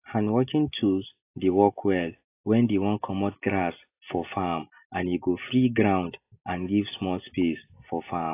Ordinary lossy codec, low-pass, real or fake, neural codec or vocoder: AAC, 24 kbps; 3.6 kHz; real; none